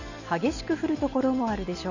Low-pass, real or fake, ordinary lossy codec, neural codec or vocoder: 7.2 kHz; real; none; none